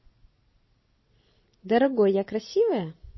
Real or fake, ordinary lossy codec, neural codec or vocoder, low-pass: fake; MP3, 24 kbps; vocoder, 44.1 kHz, 128 mel bands every 256 samples, BigVGAN v2; 7.2 kHz